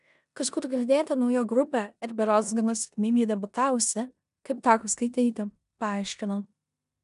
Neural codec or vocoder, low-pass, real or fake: codec, 16 kHz in and 24 kHz out, 0.9 kbps, LongCat-Audio-Codec, four codebook decoder; 10.8 kHz; fake